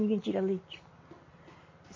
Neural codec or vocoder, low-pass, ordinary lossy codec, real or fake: none; 7.2 kHz; MP3, 32 kbps; real